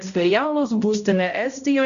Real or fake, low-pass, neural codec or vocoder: fake; 7.2 kHz; codec, 16 kHz, 0.5 kbps, X-Codec, HuBERT features, trained on balanced general audio